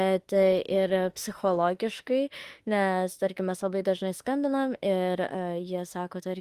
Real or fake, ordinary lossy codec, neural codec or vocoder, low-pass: fake; Opus, 24 kbps; autoencoder, 48 kHz, 32 numbers a frame, DAC-VAE, trained on Japanese speech; 14.4 kHz